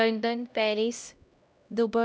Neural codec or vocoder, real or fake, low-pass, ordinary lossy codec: codec, 16 kHz, 0.5 kbps, X-Codec, HuBERT features, trained on LibriSpeech; fake; none; none